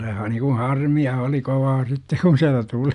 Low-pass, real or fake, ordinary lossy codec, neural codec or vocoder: 10.8 kHz; real; none; none